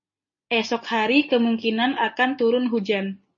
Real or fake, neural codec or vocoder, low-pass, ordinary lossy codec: real; none; 7.2 kHz; MP3, 32 kbps